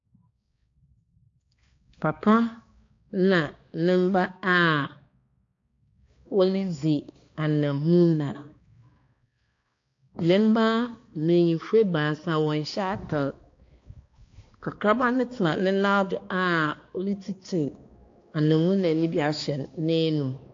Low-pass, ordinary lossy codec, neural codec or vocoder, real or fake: 7.2 kHz; AAC, 48 kbps; codec, 16 kHz, 2 kbps, X-Codec, HuBERT features, trained on balanced general audio; fake